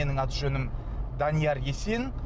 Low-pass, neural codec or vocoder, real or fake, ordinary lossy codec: none; none; real; none